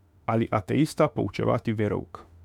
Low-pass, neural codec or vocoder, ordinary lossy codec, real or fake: 19.8 kHz; autoencoder, 48 kHz, 32 numbers a frame, DAC-VAE, trained on Japanese speech; none; fake